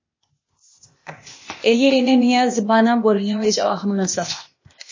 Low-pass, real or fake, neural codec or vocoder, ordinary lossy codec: 7.2 kHz; fake; codec, 16 kHz, 0.8 kbps, ZipCodec; MP3, 32 kbps